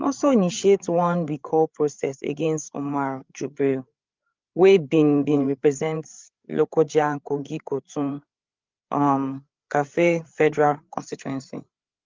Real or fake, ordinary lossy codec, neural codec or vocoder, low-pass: fake; Opus, 24 kbps; codec, 16 kHz, 8 kbps, FreqCodec, larger model; 7.2 kHz